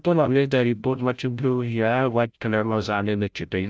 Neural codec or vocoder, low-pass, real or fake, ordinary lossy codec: codec, 16 kHz, 0.5 kbps, FreqCodec, larger model; none; fake; none